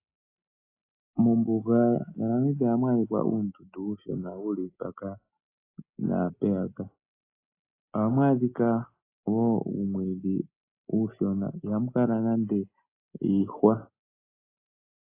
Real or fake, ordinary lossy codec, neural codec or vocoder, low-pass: real; AAC, 24 kbps; none; 3.6 kHz